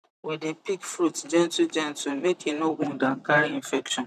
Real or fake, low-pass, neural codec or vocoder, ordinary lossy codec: fake; 14.4 kHz; vocoder, 44.1 kHz, 128 mel bands, Pupu-Vocoder; none